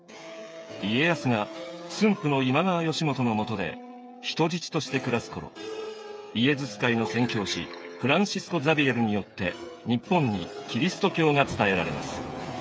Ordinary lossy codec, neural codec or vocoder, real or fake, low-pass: none; codec, 16 kHz, 8 kbps, FreqCodec, smaller model; fake; none